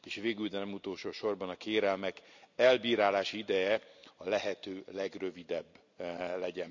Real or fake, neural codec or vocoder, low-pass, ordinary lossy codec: real; none; 7.2 kHz; none